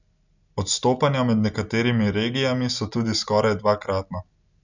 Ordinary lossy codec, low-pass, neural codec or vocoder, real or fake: none; 7.2 kHz; none; real